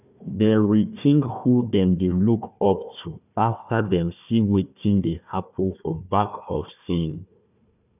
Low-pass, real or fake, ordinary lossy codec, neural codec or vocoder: 3.6 kHz; fake; none; codec, 16 kHz, 1 kbps, FunCodec, trained on Chinese and English, 50 frames a second